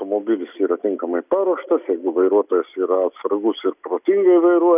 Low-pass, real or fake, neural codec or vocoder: 3.6 kHz; real; none